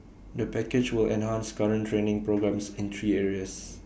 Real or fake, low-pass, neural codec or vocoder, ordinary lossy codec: real; none; none; none